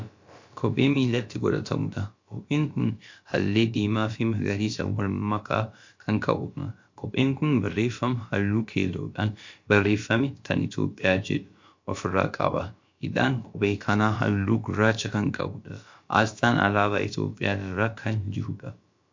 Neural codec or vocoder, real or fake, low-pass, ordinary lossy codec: codec, 16 kHz, about 1 kbps, DyCAST, with the encoder's durations; fake; 7.2 kHz; MP3, 48 kbps